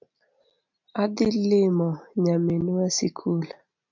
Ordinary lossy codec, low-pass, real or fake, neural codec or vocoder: MP3, 64 kbps; 7.2 kHz; real; none